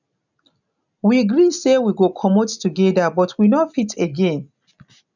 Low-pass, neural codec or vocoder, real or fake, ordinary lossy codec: 7.2 kHz; none; real; none